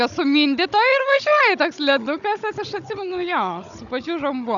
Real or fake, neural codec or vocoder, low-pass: fake; codec, 16 kHz, 16 kbps, FunCodec, trained on Chinese and English, 50 frames a second; 7.2 kHz